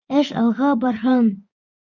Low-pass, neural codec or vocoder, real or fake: 7.2 kHz; vocoder, 24 kHz, 100 mel bands, Vocos; fake